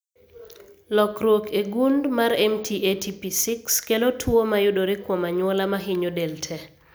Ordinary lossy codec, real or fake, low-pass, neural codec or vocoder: none; real; none; none